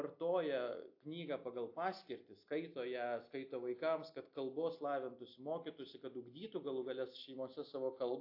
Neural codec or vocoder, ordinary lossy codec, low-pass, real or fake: none; AAC, 48 kbps; 5.4 kHz; real